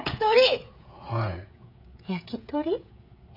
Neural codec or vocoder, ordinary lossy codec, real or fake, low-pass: codec, 16 kHz, 16 kbps, FreqCodec, smaller model; none; fake; 5.4 kHz